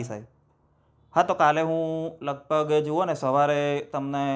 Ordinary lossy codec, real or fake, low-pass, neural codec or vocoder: none; real; none; none